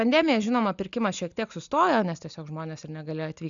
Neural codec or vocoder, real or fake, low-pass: none; real; 7.2 kHz